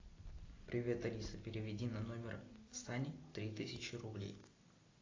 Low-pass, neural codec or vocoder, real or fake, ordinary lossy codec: 7.2 kHz; none; real; AAC, 32 kbps